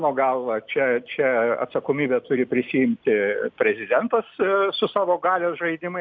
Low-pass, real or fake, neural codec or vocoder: 7.2 kHz; real; none